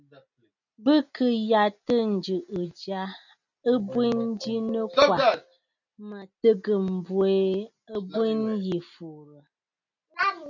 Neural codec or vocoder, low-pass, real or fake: none; 7.2 kHz; real